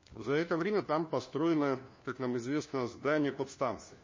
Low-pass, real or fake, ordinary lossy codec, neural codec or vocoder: 7.2 kHz; fake; MP3, 32 kbps; codec, 16 kHz, 1 kbps, FunCodec, trained on LibriTTS, 50 frames a second